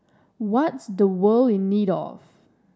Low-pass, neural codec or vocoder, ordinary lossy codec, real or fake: none; none; none; real